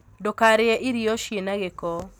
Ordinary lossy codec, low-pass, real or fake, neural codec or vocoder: none; none; real; none